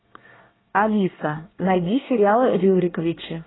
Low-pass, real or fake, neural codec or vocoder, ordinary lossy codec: 7.2 kHz; fake; codec, 24 kHz, 1 kbps, SNAC; AAC, 16 kbps